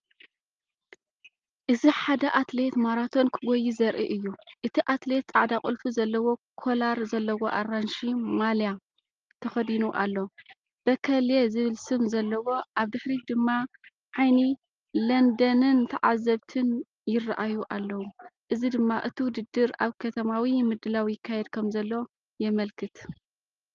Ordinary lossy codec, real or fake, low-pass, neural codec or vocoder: Opus, 24 kbps; real; 7.2 kHz; none